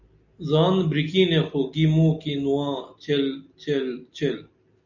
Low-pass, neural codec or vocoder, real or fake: 7.2 kHz; none; real